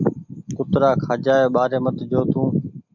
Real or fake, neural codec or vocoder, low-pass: real; none; 7.2 kHz